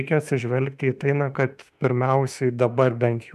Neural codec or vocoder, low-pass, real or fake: autoencoder, 48 kHz, 32 numbers a frame, DAC-VAE, trained on Japanese speech; 14.4 kHz; fake